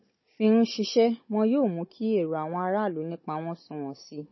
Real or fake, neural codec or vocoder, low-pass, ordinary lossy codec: fake; codec, 16 kHz, 16 kbps, FunCodec, trained on Chinese and English, 50 frames a second; 7.2 kHz; MP3, 24 kbps